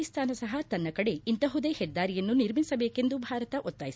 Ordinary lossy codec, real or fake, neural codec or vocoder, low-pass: none; real; none; none